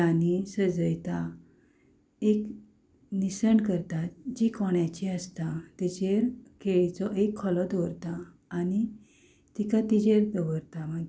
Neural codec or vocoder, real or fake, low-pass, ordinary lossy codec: none; real; none; none